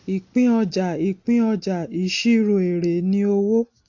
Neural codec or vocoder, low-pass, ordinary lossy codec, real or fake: none; 7.2 kHz; AAC, 48 kbps; real